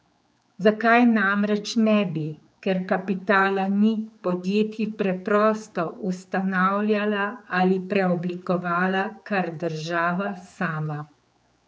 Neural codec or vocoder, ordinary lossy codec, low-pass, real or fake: codec, 16 kHz, 4 kbps, X-Codec, HuBERT features, trained on general audio; none; none; fake